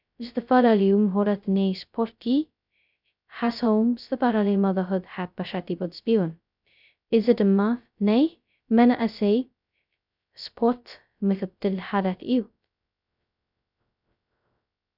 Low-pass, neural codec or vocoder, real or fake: 5.4 kHz; codec, 16 kHz, 0.2 kbps, FocalCodec; fake